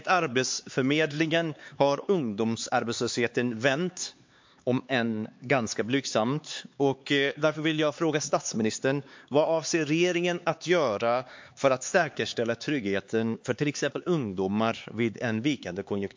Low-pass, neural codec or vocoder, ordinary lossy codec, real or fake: 7.2 kHz; codec, 16 kHz, 4 kbps, X-Codec, HuBERT features, trained on LibriSpeech; MP3, 48 kbps; fake